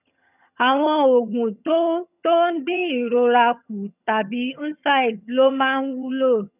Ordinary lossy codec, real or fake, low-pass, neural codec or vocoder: none; fake; 3.6 kHz; vocoder, 22.05 kHz, 80 mel bands, HiFi-GAN